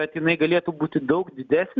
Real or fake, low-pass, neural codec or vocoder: real; 7.2 kHz; none